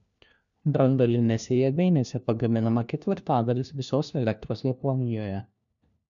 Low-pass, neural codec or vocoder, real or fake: 7.2 kHz; codec, 16 kHz, 1 kbps, FunCodec, trained on LibriTTS, 50 frames a second; fake